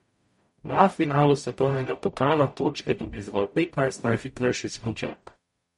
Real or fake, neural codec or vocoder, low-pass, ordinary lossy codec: fake; codec, 44.1 kHz, 0.9 kbps, DAC; 19.8 kHz; MP3, 48 kbps